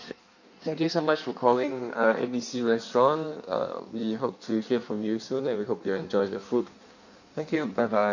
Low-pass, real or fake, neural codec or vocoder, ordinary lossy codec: 7.2 kHz; fake; codec, 16 kHz in and 24 kHz out, 1.1 kbps, FireRedTTS-2 codec; none